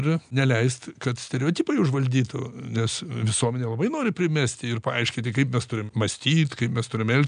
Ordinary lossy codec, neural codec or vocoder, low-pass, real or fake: MP3, 96 kbps; none; 9.9 kHz; real